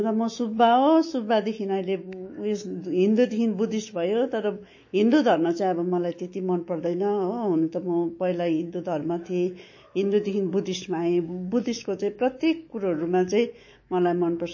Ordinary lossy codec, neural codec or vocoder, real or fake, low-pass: MP3, 32 kbps; autoencoder, 48 kHz, 128 numbers a frame, DAC-VAE, trained on Japanese speech; fake; 7.2 kHz